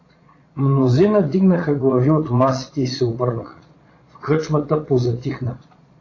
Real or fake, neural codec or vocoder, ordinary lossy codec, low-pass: fake; vocoder, 22.05 kHz, 80 mel bands, Vocos; AAC, 32 kbps; 7.2 kHz